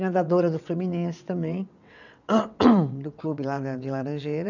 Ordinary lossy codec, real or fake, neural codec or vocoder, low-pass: none; fake; vocoder, 22.05 kHz, 80 mel bands, WaveNeXt; 7.2 kHz